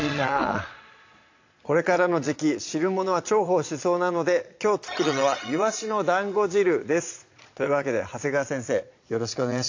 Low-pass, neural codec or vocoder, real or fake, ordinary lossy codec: 7.2 kHz; vocoder, 22.05 kHz, 80 mel bands, Vocos; fake; AAC, 48 kbps